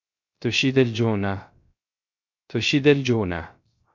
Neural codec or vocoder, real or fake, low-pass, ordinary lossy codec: codec, 16 kHz, 0.3 kbps, FocalCodec; fake; 7.2 kHz; MP3, 48 kbps